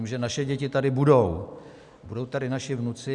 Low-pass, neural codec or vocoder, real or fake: 10.8 kHz; none; real